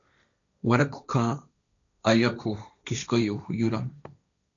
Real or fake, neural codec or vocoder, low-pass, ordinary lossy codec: fake; codec, 16 kHz, 1.1 kbps, Voila-Tokenizer; 7.2 kHz; MP3, 96 kbps